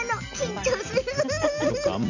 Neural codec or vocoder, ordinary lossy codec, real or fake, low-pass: none; none; real; 7.2 kHz